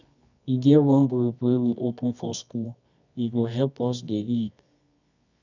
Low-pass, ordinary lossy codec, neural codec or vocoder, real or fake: 7.2 kHz; none; codec, 24 kHz, 0.9 kbps, WavTokenizer, medium music audio release; fake